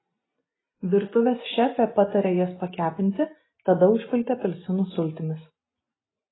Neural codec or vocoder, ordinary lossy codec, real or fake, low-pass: none; AAC, 16 kbps; real; 7.2 kHz